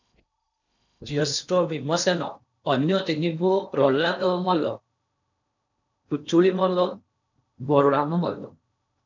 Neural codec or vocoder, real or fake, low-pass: codec, 16 kHz in and 24 kHz out, 0.8 kbps, FocalCodec, streaming, 65536 codes; fake; 7.2 kHz